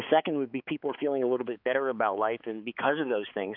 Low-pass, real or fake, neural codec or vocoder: 5.4 kHz; fake; codec, 16 kHz, 4 kbps, X-Codec, HuBERT features, trained on balanced general audio